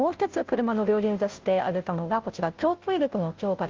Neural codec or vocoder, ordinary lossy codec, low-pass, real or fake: codec, 16 kHz, 0.5 kbps, FunCodec, trained on Chinese and English, 25 frames a second; Opus, 32 kbps; 7.2 kHz; fake